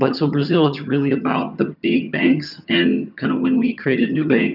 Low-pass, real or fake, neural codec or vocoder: 5.4 kHz; fake; vocoder, 22.05 kHz, 80 mel bands, HiFi-GAN